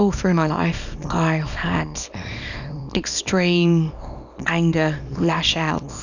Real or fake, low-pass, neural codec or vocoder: fake; 7.2 kHz; codec, 24 kHz, 0.9 kbps, WavTokenizer, small release